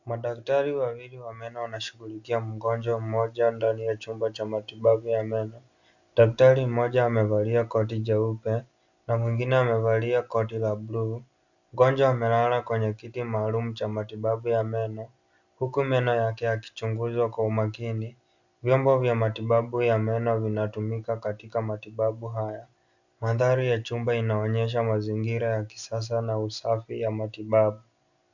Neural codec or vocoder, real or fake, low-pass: none; real; 7.2 kHz